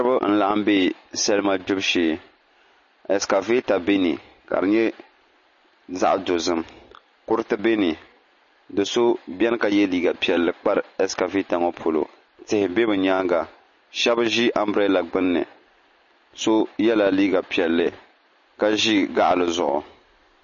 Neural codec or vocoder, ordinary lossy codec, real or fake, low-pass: none; MP3, 32 kbps; real; 7.2 kHz